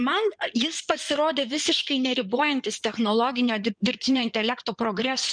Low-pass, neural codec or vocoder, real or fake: 9.9 kHz; codec, 16 kHz in and 24 kHz out, 2.2 kbps, FireRedTTS-2 codec; fake